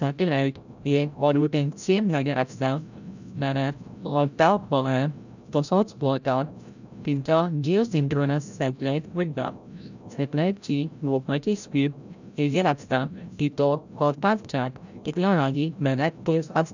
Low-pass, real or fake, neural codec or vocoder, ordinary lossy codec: 7.2 kHz; fake; codec, 16 kHz, 0.5 kbps, FreqCodec, larger model; none